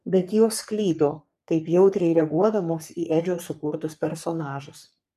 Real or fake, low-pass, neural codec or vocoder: fake; 14.4 kHz; codec, 44.1 kHz, 3.4 kbps, Pupu-Codec